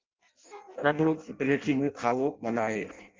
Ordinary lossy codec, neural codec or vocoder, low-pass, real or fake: Opus, 24 kbps; codec, 16 kHz in and 24 kHz out, 0.6 kbps, FireRedTTS-2 codec; 7.2 kHz; fake